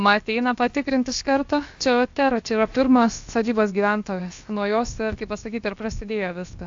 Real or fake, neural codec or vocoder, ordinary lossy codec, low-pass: fake; codec, 16 kHz, about 1 kbps, DyCAST, with the encoder's durations; AAC, 64 kbps; 7.2 kHz